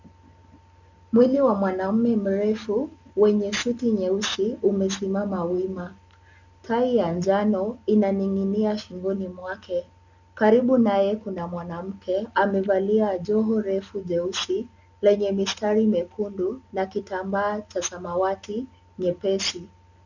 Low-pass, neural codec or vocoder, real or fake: 7.2 kHz; none; real